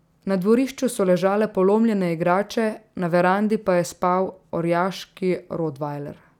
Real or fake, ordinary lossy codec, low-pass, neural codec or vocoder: real; none; 19.8 kHz; none